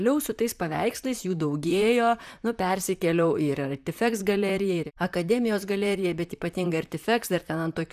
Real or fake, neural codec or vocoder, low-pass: fake; vocoder, 44.1 kHz, 128 mel bands, Pupu-Vocoder; 14.4 kHz